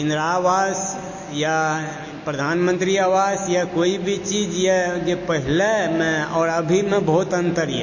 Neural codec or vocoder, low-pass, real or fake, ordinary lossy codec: none; 7.2 kHz; real; MP3, 32 kbps